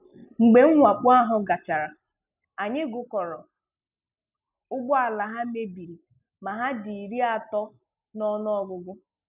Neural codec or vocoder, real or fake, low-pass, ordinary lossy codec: none; real; 3.6 kHz; none